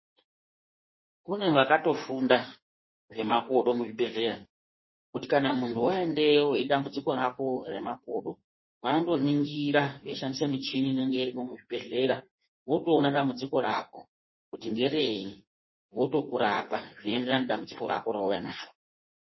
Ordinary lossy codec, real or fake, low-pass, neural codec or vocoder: MP3, 24 kbps; fake; 7.2 kHz; codec, 16 kHz in and 24 kHz out, 1.1 kbps, FireRedTTS-2 codec